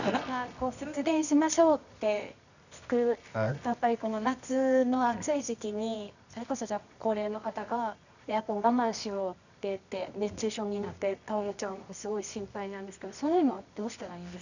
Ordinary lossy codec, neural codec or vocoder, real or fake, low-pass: none; codec, 24 kHz, 0.9 kbps, WavTokenizer, medium music audio release; fake; 7.2 kHz